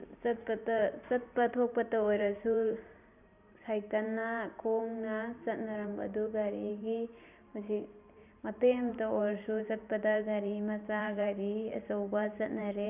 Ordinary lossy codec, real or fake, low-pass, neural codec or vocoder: none; fake; 3.6 kHz; vocoder, 44.1 kHz, 128 mel bands every 512 samples, BigVGAN v2